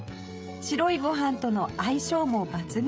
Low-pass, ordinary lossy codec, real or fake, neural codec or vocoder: none; none; fake; codec, 16 kHz, 16 kbps, FreqCodec, smaller model